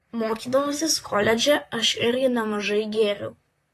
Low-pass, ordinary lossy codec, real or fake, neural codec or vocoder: 14.4 kHz; AAC, 48 kbps; fake; vocoder, 44.1 kHz, 128 mel bands, Pupu-Vocoder